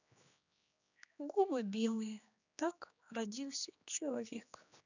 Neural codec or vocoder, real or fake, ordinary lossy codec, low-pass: codec, 16 kHz, 2 kbps, X-Codec, HuBERT features, trained on general audio; fake; none; 7.2 kHz